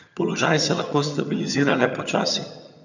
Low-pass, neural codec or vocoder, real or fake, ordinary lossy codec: 7.2 kHz; vocoder, 22.05 kHz, 80 mel bands, HiFi-GAN; fake; none